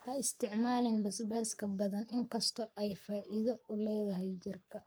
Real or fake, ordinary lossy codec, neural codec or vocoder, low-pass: fake; none; codec, 44.1 kHz, 3.4 kbps, Pupu-Codec; none